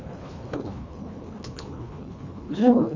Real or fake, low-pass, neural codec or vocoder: fake; 7.2 kHz; codec, 24 kHz, 1.5 kbps, HILCodec